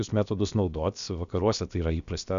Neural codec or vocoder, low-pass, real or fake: codec, 16 kHz, about 1 kbps, DyCAST, with the encoder's durations; 7.2 kHz; fake